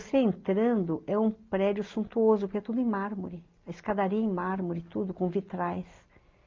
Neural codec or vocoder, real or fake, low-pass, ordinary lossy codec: none; real; 7.2 kHz; Opus, 24 kbps